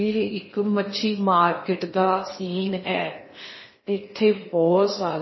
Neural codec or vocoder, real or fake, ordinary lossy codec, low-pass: codec, 16 kHz in and 24 kHz out, 0.8 kbps, FocalCodec, streaming, 65536 codes; fake; MP3, 24 kbps; 7.2 kHz